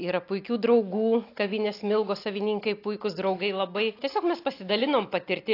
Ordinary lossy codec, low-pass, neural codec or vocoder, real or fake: AAC, 32 kbps; 5.4 kHz; none; real